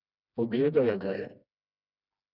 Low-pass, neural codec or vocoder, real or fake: 5.4 kHz; codec, 16 kHz, 1 kbps, FreqCodec, smaller model; fake